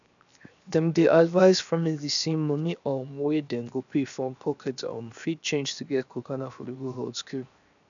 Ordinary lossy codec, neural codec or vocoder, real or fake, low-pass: none; codec, 16 kHz, 0.7 kbps, FocalCodec; fake; 7.2 kHz